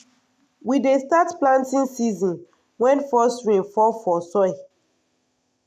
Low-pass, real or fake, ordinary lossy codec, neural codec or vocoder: 14.4 kHz; real; none; none